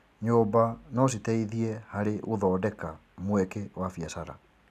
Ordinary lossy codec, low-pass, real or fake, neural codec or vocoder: none; 14.4 kHz; real; none